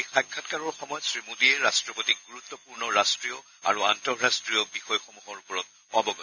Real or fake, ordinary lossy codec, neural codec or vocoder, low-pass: real; none; none; 7.2 kHz